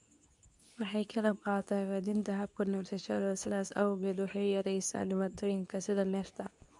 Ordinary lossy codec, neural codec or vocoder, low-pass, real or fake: none; codec, 24 kHz, 0.9 kbps, WavTokenizer, medium speech release version 2; 10.8 kHz; fake